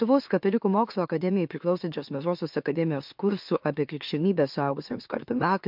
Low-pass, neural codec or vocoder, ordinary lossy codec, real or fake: 5.4 kHz; autoencoder, 44.1 kHz, a latent of 192 numbers a frame, MeloTTS; MP3, 48 kbps; fake